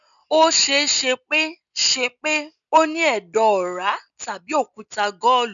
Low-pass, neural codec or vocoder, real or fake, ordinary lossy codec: 7.2 kHz; none; real; none